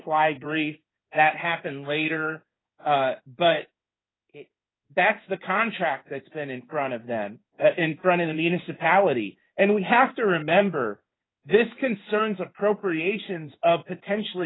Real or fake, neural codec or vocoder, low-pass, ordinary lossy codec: fake; codec, 16 kHz in and 24 kHz out, 1 kbps, XY-Tokenizer; 7.2 kHz; AAC, 16 kbps